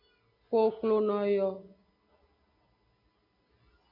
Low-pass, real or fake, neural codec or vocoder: 5.4 kHz; real; none